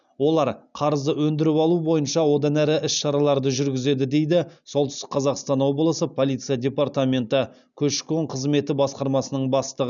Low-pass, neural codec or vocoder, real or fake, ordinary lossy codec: 7.2 kHz; none; real; none